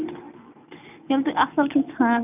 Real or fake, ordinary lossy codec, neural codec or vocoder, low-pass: real; none; none; 3.6 kHz